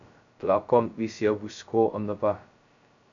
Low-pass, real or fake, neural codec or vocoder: 7.2 kHz; fake; codec, 16 kHz, 0.2 kbps, FocalCodec